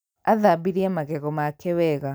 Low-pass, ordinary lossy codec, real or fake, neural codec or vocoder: none; none; real; none